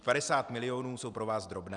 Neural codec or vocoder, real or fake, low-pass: none; real; 10.8 kHz